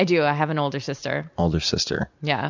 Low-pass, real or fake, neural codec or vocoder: 7.2 kHz; real; none